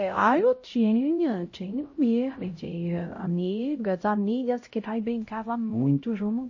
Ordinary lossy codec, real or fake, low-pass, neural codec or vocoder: MP3, 32 kbps; fake; 7.2 kHz; codec, 16 kHz, 0.5 kbps, X-Codec, HuBERT features, trained on LibriSpeech